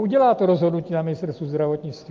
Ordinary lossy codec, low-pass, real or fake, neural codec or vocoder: Opus, 32 kbps; 7.2 kHz; real; none